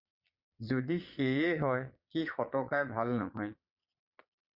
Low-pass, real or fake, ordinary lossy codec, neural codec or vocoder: 5.4 kHz; fake; Opus, 64 kbps; vocoder, 22.05 kHz, 80 mel bands, Vocos